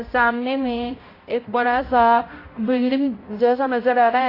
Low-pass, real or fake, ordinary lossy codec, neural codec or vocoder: 5.4 kHz; fake; AAC, 24 kbps; codec, 16 kHz, 0.5 kbps, X-Codec, HuBERT features, trained on balanced general audio